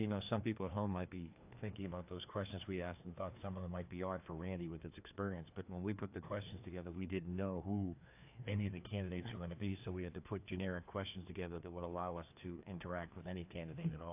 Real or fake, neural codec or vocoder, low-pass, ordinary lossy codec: fake; codec, 16 kHz, 2 kbps, FreqCodec, larger model; 3.6 kHz; AAC, 32 kbps